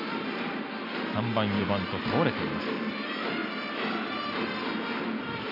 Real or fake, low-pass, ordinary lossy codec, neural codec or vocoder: real; 5.4 kHz; none; none